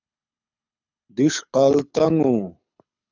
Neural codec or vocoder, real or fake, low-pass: codec, 24 kHz, 6 kbps, HILCodec; fake; 7.2 kHz